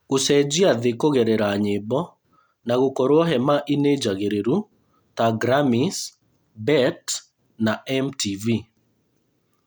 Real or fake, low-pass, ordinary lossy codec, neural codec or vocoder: real; none; none; none